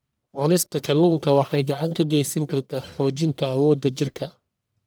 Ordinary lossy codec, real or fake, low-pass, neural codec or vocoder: none; fake; none; codec, 44.1 kHz, 1.7 kbps, Pupu-Codec